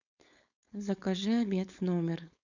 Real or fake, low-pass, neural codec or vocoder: fake; 7.2 kHz; codec, 16 kHz, 4.8 kbps, FACodec